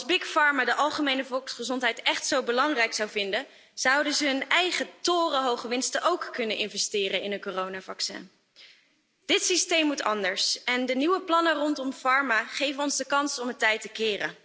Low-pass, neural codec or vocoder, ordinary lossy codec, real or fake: none; none; none; real